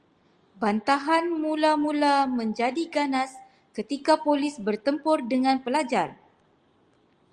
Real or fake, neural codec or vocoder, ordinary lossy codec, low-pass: real; none; Opus, 32 kbps; 9.9 kHz